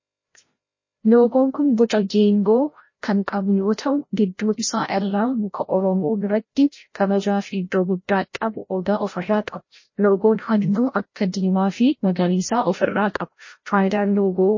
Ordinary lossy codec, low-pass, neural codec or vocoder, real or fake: MP3, 32 kbps; 7.2 kHz; codec, 16 kHz, 0.5 kbps, FreqCodec, larger model; fake